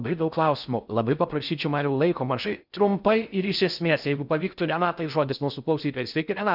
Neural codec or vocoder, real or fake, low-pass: codec, 16 kHz in and 24 kHz out, 0.6 kbps, FocalCodec, streaming, 4096 codes; fake; 5.4 kHz